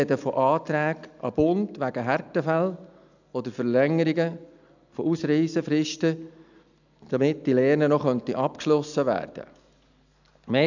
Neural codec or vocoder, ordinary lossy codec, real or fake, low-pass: none; none; real; 7.2 kHz